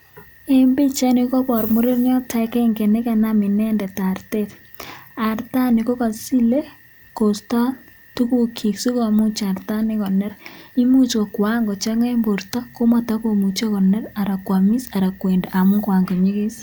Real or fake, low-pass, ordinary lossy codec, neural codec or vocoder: real; none; none; none